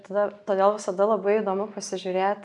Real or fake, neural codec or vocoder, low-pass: real; none; 10.8 kHz